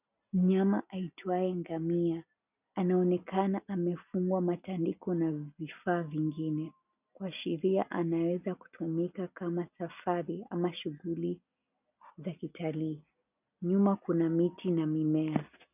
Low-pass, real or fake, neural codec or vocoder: 3.6 kHz; real; none